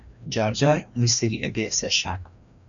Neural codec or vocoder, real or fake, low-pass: codec, 16 kHz, 1 kbps, FreqCodec, larger model; fake; 7.2 kHz